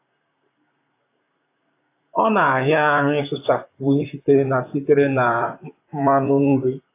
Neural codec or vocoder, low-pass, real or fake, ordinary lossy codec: vocoder, 44.1 kHz, 128 mel bands, Pupu-Vocoder; 3.6 kHz; fake; AAC, 24 kbps